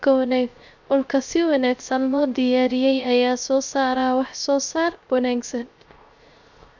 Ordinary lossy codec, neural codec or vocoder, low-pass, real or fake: none; codec, 16 kHz, 0.3 kbps, FocalCodec; 7.2 kHz; fake